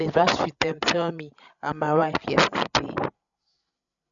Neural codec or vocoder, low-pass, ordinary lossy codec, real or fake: codec, 16 kHz, 16 kbps, FreqCodec, larger model; 7.2 kHz; none; fake